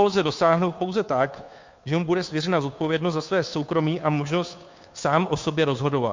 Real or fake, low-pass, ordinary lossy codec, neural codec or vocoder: fake; 7.2 kHz; MP3, 48 kbps; codec, 16 kHz, 2 kbps, FunCodec, trained on Chinese and English, 25 frames a second